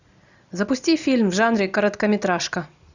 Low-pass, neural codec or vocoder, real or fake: 7.2 kHz; none; real